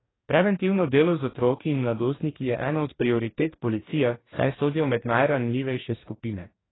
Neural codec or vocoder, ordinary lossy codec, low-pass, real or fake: codec, 44.1 kHz, 2.6 kbps, DAC; AAC, 16 kbps; 7.2 kHz; fake